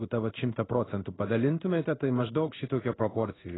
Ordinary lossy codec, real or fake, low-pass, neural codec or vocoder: AAC, 16 kbps; fake; 7.2 kHz; codec, 16 kHz in and 24 kHz out, 1 kbps, XY-Tokenizer